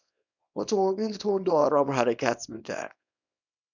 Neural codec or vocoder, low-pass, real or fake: codec, 24 kHz, 0.9 kbps, WavTokenizer, small release; 7.2 kHz; fake